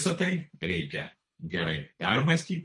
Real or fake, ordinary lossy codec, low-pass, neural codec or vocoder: fake; MP3, 48 kbps; 10.8 kHz; codec, 24 kHz, 3 kbps, HILCodec